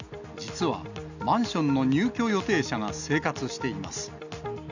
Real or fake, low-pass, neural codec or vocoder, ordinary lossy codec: real; 7.2 kHz; none; none